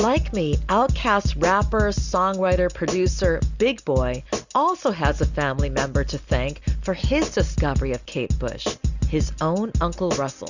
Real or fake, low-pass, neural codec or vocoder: real; 7.2 kHz; none